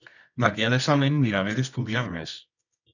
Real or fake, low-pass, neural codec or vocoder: fake; 7.2 kHz; codec, 24 kHz, 0.9 kbps, WavTokenizer, medium music audio release